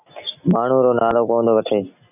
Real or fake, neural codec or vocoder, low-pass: real; none; 3.6 kHz